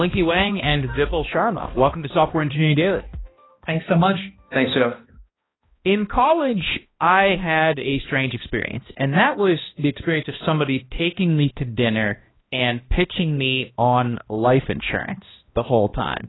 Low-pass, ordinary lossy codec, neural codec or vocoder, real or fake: 7.2 kHz; AAC, 16 kbps; codec, 16 kHz, 1 kbps, X-Codec, HuBERT features, trained on balanced general audio; fake